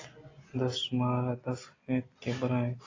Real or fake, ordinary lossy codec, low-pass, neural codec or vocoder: real; AAC, 32 kbps; 7.2 kHz; none